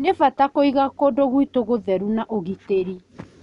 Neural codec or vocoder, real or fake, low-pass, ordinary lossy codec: none; real; 10.8 kHz; none